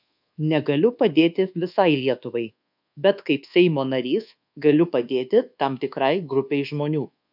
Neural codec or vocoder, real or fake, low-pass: codec, 24 kHz, 1.2 kbps, DualCodec; fake; 5.4 kHz